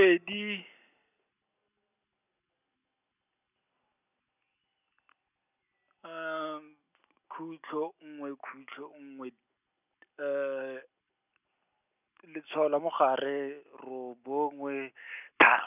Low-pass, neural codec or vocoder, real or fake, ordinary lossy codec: 3.6 kHz; none; real; none